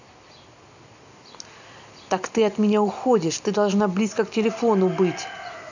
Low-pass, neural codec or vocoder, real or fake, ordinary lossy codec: 7.2 kHz; none; real; none